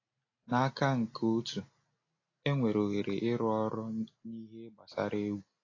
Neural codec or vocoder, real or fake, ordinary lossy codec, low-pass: none; real; AAC, 32 kbps; 7.2 kHz